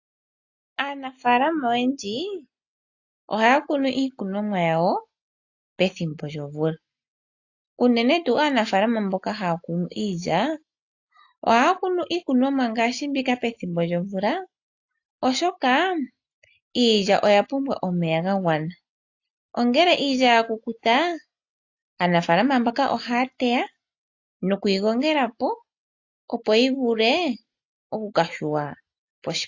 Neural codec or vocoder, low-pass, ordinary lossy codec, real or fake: none; 7.2 kHz; AAC, 48 kbps; real